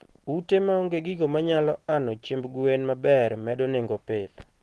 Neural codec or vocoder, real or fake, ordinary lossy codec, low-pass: none; real; Opus, 16 kbps; 10.8 kHz